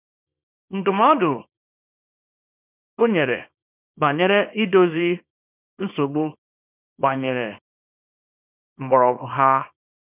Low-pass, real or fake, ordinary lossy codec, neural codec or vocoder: 3.6 kHz; fake; none; codec, 24 kHz, 0.9 kbps, WavTokenizer, small release